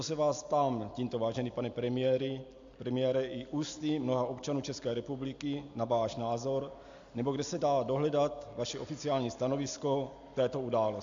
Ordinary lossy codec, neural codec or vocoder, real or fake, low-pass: AAC, 64 kbps; none; real; 7.2 kHz